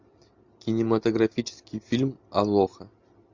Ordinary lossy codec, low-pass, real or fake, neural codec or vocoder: MP3, 64 kbps; 7.2 kHz; real; none